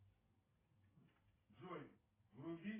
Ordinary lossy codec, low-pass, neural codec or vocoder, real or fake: AAC, 16 kbps; 3.6 kHz; none; real